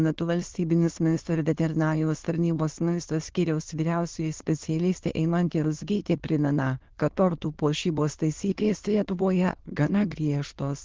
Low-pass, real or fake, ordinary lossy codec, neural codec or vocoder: 7.2 kHz; fake; Opus, 16 kbps; autoencoder, 22.05 kHz, a latent of 192 numbers a frame, VITS, trained on many speakers